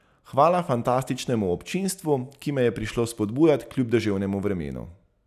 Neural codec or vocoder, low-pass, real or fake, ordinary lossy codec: none; 14.4 kHz; real; none